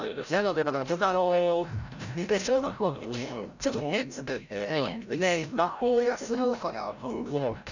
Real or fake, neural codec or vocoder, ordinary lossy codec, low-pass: fake; codec, 16 kHz, 0.5 kbps, FreqCodec, larger model; none; 7.2 kHz